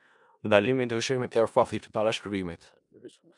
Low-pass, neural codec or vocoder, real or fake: 10.8 kHz; codec, 16 kHz in and 24 kHz out, 0.4 kbps, LongCat-Audio-Codec, four codebook decoder; fake